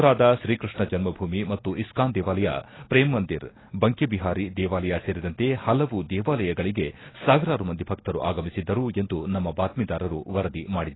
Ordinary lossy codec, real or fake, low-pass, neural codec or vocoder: AAC, 16 kbps; real; 7.2 kHz; none